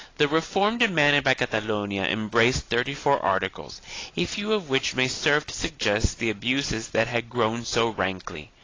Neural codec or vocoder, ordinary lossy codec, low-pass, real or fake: vocoder, 44.1 kHz, 128 mel bands every 256 samples, BigVGAN v2; AAC, 32 kbps; 7.2 kHz; fake